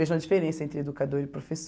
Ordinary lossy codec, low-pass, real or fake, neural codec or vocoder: none; none; real; none